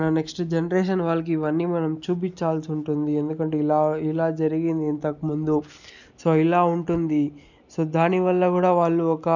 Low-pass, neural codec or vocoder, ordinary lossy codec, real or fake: 7.2 kHz; none; none; real